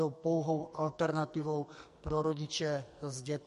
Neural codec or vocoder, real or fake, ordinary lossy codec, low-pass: codec, 44.1 kHz, 2.6 kbps, SNAC; fake; MP3, 48 kbps; 14.4 kHz